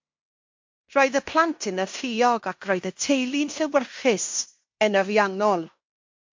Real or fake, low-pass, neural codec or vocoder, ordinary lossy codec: fake; 7.2 kHz; codec, 16 kHz in and 24 kHz out, 0.9 kbps, LongCat-Audio-Codec, fine tuned four codebook decoder; MP3, 48 kbps